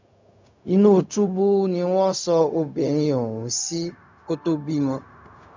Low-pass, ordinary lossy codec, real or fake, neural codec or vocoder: 7.2 kHz; MP3, 64 kbps; fake; codec, 16 kHz, 0.4 kbps, LongCat-Audio-Codec